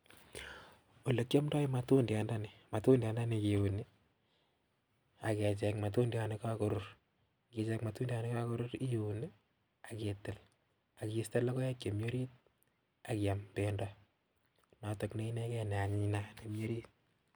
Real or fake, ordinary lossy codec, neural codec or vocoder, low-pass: real; none; none; none